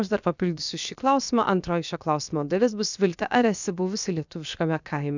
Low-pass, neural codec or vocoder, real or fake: 7.2 kHz; codec, 16 kHz, 0.7 kbps, FocalCodec; fake